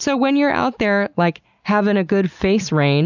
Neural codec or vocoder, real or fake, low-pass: none; real; 7.2 kHz